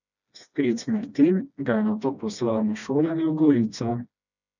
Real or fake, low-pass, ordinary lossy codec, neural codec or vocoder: fake; 7.2 kHz; none; codec, 16 kHz, 1 kbps, FreqCodec, smaller model